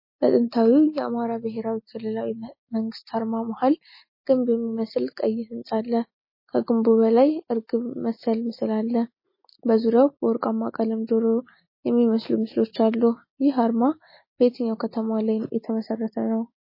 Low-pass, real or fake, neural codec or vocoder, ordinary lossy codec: 5.4 kHz; real; none; MP3, 24 kbps